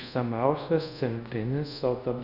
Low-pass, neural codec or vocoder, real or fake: 5.4 kHz; codec, 24 kHz, 0.5 kbps, DualCodec; fake